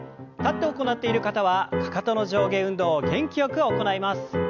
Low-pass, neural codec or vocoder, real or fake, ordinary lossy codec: 7.2 kHz; none; real; none